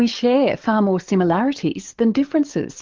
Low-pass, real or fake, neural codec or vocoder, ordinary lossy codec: 7.2 kHz; fake; codec, 44.1 kHz, 7.8 kbps, DAC; Opus, 32 kbps